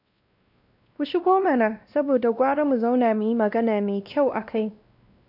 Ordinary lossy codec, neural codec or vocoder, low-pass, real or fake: none; codec, 16 kHz, 1 kbps, X-Codec, WavLM features, trained on Multilingual LibriSpeech; 5.4 kHz; fake